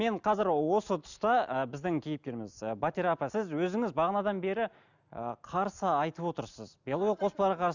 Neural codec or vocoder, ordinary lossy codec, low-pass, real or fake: none; none; 7.2 kHz; real